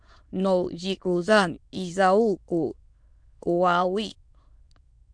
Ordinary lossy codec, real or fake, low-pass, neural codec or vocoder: MP3, 64 kbps; fake; 9.9 kHz; autoencoder, 22.05 kHz, a latent of 192 numbers a frame, VITS, trained on many speakers